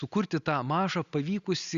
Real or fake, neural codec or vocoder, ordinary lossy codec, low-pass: real; none; Opus, 64 kbps; 7.2 kHz